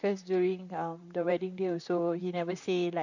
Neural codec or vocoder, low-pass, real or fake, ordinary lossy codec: vocoder, 44.1 kHz, 128 mel bands, Pupu-Vocoder; 7.2 kHz; fake; none